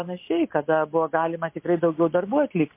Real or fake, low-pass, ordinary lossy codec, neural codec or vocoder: real; 3.6 kHz; MP3, 24 kbps; none